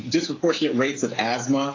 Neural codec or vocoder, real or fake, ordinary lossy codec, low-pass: codec, 16 kHz, 8 kbps, FreqCodec, smaller model; fake; AAC, 32 kbps; 7.2 kHz